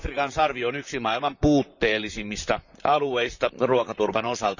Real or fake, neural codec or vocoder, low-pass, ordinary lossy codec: fake; vocoder, 44.1 kHz, 128 mel bands, Pupu-Vocoder; 7.2 kHz; none